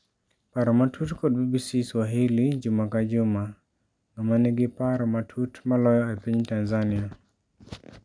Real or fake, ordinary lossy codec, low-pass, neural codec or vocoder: real; none; 9.9 kHz; none